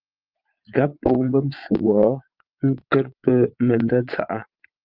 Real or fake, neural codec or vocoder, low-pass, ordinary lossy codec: fake; vocoder, 22.05 kHz, 80 mel bands, Vocos; 5.4 kHz; Opus, 24 kbps